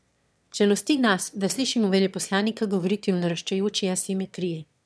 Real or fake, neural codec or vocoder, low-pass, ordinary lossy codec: fake; autoencoder, 22.05 kHz, a latent of 192 numbers a frame, VITS, trained on one speaker; none; none